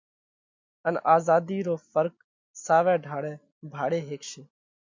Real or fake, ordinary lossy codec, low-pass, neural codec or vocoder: real; MP3, 48 kbps; 7.2 kHz; none